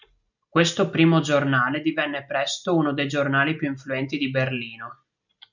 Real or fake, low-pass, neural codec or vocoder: real; 7.2 kHz; none